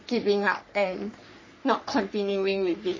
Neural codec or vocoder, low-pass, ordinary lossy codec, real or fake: codec, 44.1 kHz, 3.4 kbps, Pupu-Codec; 7.2 kHz; MP3, 32 kbps; fake